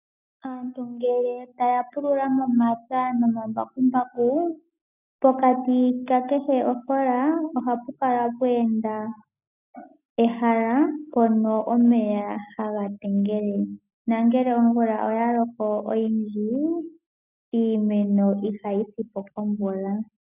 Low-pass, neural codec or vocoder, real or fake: 3.6 kHz; none; real